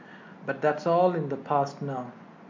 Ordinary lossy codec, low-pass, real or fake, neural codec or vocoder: MP3, 48 kbps; 7.2 kHz; real; none